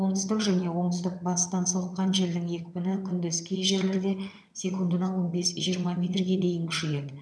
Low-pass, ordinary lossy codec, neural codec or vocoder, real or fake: none; none; vocoder, 22.05 kHz, 80 mel bands, HiFi-GAN; fake